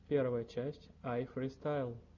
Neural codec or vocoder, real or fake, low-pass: none; real; 7.2 kHz